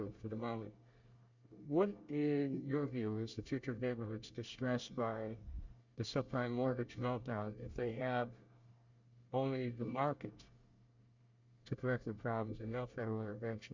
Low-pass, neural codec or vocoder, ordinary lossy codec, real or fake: 7.2 kHz; codec, 24 kHz, 1 kbps, SNAC; Opus, 64 kbps; fake